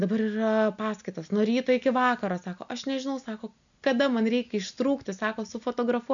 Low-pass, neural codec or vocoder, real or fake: 7.2 kHz; none; real